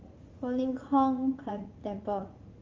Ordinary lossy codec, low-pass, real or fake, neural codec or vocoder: Opus, 32 kbps; 7.2 kHz; fake; vocoder, 44.1 kHz, 80 mel bands, Vocos